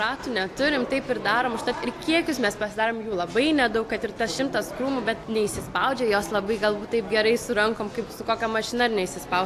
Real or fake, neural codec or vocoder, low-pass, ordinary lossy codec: real; none; 14.4 kHz; AAC, 64 kbps